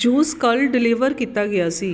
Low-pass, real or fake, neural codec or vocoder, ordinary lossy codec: none; real; none; none